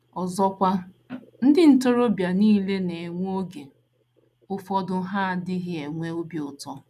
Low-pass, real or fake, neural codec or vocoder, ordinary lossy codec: 14.4 kHz; real; none; none